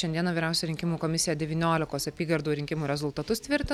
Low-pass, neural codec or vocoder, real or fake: 19.8 kHz; none; real